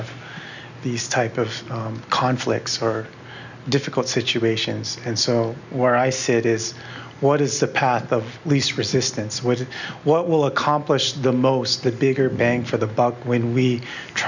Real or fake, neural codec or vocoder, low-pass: real; none; 7.2 kHz